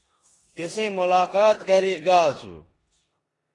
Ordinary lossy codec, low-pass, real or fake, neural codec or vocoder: AAC, 32 kbps; 10.8 kHz; fake; codec, 16 kHz in and 24 kHz out, 0.9 kbps, LongCat-Audio-Codec, four codebook decoder